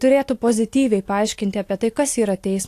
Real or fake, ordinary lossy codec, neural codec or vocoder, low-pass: real; AAC, 64 kbps; none; 14.4 kHz